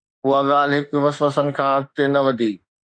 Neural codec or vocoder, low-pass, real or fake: autoencoder, 48 kHz, 32 numbers a frame, DAC-VAE, trained on Japanese speech; 9.9 kHz; fake